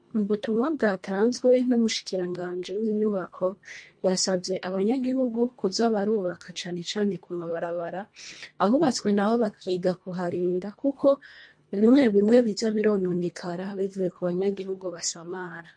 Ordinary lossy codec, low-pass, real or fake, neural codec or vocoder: MP3, 48 kbps; 9.9 kHz; fake; codec, 24 kHz, 1.5 kbps, HILCodec